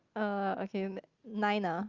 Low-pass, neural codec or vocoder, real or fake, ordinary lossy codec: 7.2 kHz; none; real; Opus, 32 kbps